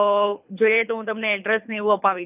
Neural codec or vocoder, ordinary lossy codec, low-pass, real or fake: codec, 24 kHz, 3 kbps, HILCodec; none; 3.6 kHz; fake